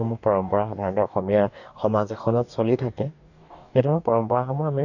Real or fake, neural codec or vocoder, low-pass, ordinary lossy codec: fake; codec, 44.1 kHz, 2.6 kbps, DAC; 7.2 kHz; none